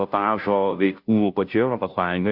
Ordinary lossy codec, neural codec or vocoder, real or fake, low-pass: AAC, 48 kbps; codec, 16 kHz, 0.5 kbps, FunCodec, trained on Chinese and English, 25 frames a second; fake; 5.4 kHz